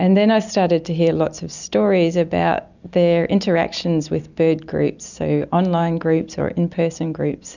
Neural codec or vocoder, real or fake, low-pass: none; real; 7.2 kHz